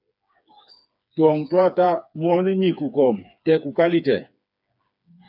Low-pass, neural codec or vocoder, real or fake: 5.4 kHz; codec, 16 kHz, 4 kbps, FreqCodec, smaller model; fake